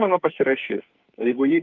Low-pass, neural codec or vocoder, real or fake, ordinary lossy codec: 7.2 kHz; codec, 44.1 kHz, 2.6 kbps, SNAC; fake; Opus, 32 kbps